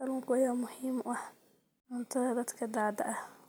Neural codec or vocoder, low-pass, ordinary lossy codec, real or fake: none; none; none; real